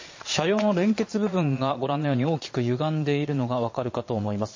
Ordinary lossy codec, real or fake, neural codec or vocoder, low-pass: MP3, 32 kbps; fake; vocoder, 22.05 kHz, 80 mel bands, Vocos; 7.2 kHz